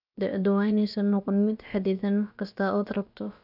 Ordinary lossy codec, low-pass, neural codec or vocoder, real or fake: none; 5.4 kHz; codec, 16 kHz, about 1 kbps, DyCAST, with the encoder's durations; fake